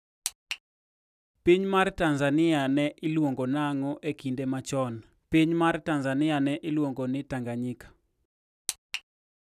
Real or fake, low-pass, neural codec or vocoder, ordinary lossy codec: real; 14.4 kHz; none; none